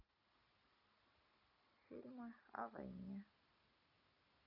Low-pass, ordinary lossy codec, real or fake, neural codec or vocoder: 5.4 kHz; none; real; none